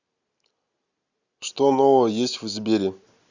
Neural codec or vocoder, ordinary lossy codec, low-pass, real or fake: none; Opus, 64 kbps; 7.2 kHz; real